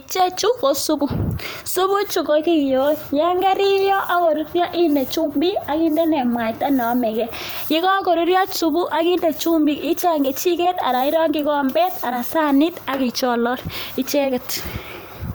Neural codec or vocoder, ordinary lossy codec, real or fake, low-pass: vocoder, 44.1 kHz, 128 mel bands every 512 samples, BigVGAN v2; none; fake; none